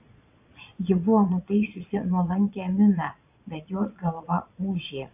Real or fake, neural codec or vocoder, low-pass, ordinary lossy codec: fake; vocoder, 22.05 kHz, 80 mel bands, Vocos; 3.6 kHz; AAC, 32 kbps